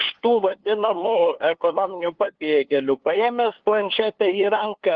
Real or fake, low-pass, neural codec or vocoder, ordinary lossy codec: fake; 7.2 kHz; codec, 16 kHz, 2 kbps, FunCodec, trained on LibriTTS, 25 frames a second; Opus, 16 kbps